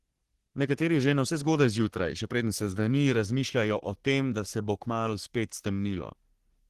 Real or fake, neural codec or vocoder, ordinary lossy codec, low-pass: fake; codec, 44.1 kHz, 3.4 kbps, Pupu-Codec; Opus, 16 kbps; 14.4 kHz